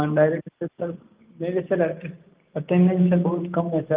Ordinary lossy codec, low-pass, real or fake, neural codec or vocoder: Opus, 32 kbps; 3.6 kHz; real; none